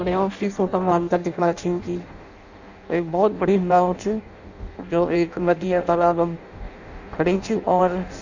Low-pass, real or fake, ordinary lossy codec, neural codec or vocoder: 7.2 kHz; fake; none; codec, 16 kHz in and 24 kHz out, 0.6 kbps, FireRedTTS-2 codec